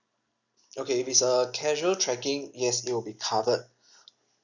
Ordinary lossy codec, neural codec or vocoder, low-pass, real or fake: none; none; 7.2 kHz; real